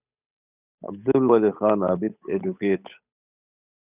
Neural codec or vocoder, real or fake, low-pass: codec, 16 kHz, 8 kbps, FunCodec, trained on Chinese and English, 25 frames a second; fake; 3.6 kHz